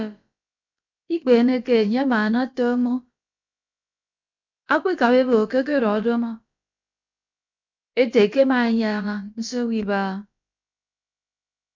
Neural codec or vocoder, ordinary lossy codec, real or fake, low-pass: codec, 16 kHz, about 1 kbps, DyCAST, with the encoder's durations; MP3, 64 kbps; fake; 7.2 kHz